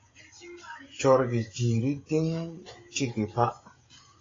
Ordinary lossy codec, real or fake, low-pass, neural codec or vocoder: AAC, 32 kbps; fake; 7.2 kHz; codec, 16 kHz, 16 kbps, FreqCodec, smaller model